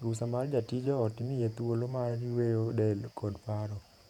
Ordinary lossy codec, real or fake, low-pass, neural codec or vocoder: none; fake; 19.8 kHz; vocoder, 48 kHz, 128 mel bands, Vocos